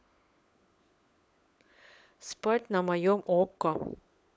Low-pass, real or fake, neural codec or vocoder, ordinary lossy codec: none; fake; codec, 16 kHz, 16 kbps, FunCodec, trained on LibriTTS, 50 frames a second; none